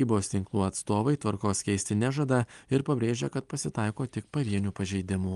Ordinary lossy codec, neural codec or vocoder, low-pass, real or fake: Opus, 32 kbps; none; 10.8 kHz; real